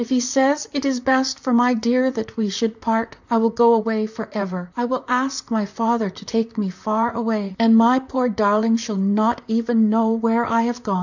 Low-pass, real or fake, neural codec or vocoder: 7.2 kHz; fake; vocoder, 44.1 kHz, 128 mel bands, Pupu-Vocoder